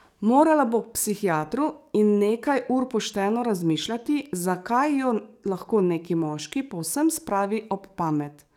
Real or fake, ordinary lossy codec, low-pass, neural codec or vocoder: fake; none; 19.8 kHz; codec, 44.1 kHz, 7.8 kbps, DAC